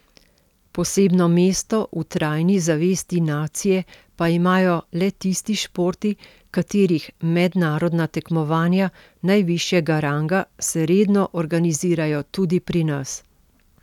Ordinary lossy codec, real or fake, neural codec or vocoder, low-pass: none; real; none; 19.8 kHz